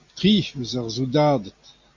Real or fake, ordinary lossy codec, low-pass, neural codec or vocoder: real; MP3, 48 kbps; 7.2 kHz; none